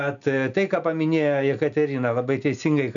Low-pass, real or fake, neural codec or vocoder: 7.2 kHz; real; none